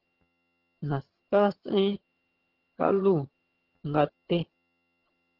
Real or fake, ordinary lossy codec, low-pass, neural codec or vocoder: fake; Opus, 16 kbps; 5.4 kHz; vocoder, 22.05 kHz, 80 mel bands, HiFi-GAN